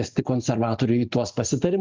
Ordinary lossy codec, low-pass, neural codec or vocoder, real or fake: Opus, 24 kbps; 7.2 kHz; none; real